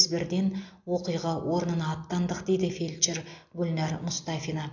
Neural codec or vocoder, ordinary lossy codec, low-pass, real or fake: none; AAC, 48 kbps; 7.2 kHz; real